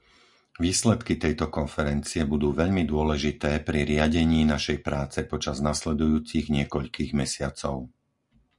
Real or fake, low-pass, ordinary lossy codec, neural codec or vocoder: real; 10.8 kHz; Opus, 64 kbps; none